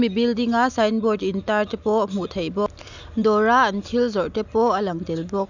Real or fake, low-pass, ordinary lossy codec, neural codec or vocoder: real; 7.2 kHz; none; none